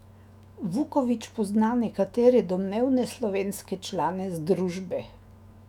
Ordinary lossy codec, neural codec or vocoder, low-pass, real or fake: none; autoencoder, 48 kHz, 128 numbers a frame, DAC-VAE, trained on Japanese speech; 19.8 kHz; fake